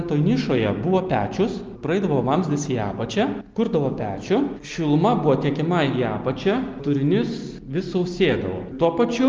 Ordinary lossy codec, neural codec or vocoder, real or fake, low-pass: Opus, 32 kbps; none; real; 7.2 kHz